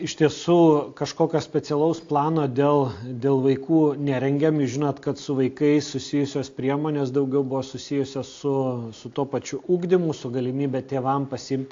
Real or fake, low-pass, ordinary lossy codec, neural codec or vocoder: real; 7.2 kHz; AAC, 48 kbps; none